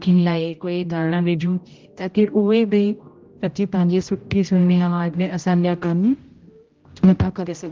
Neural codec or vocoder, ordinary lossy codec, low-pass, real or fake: codec, 16 kHz, 0.5 kbps, X-Codec, HuBERT features, trained on general audio; Opus, 32 kbps; 7.2 kHz; fake